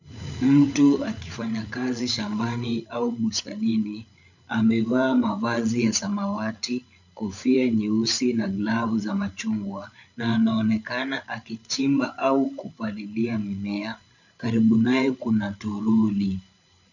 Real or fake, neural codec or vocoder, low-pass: fake; codec, 16 kHz, 8 kbps, FreqCodec, larger model; 7.2 kHz